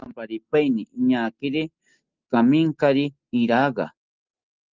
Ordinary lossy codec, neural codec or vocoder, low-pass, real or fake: Opus, 32 kbps; none; 7.2 kHz; real